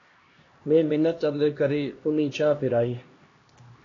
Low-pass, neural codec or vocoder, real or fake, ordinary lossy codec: 7.2 kHz; codec, 16 kHz, 1 kbps, X-Codec, HuBERT features, trained on LibriSpeech; fake; AAC, 32 kbps